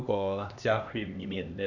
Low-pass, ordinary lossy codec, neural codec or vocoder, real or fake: 7.2 kHz; none; codec, 16 kHz, 2 kbps, X-Codec, HuBERT features, trained on LibriSpeech; fake